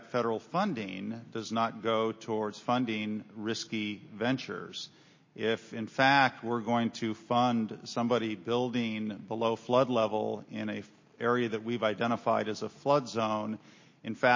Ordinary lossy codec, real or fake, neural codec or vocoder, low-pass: MP3, 32 kbps; real; none; 7.2 kHz